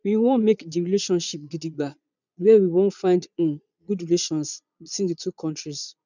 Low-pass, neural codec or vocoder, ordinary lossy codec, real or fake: 7.2 kHz; vocoder, 22.05 kHz, 80 mel bands, Vocos; none; fake